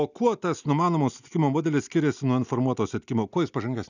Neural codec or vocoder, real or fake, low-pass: none; real; 7.2 kHz